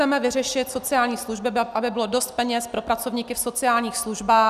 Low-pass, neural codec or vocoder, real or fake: 14.4 kHz; none; real